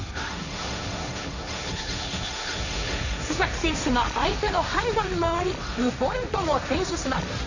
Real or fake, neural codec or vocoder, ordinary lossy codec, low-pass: fake; codec, 16 kHz, 1.1 kbps, Voila-Tokenizer; none; 7.2 kHz